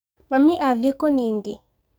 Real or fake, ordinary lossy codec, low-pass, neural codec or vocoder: fake; none; none; codec, 44.1 kHz, 2.6 kbps, SNAC